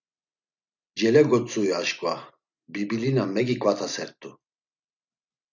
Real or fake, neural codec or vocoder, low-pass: real; none; 7.2 kHz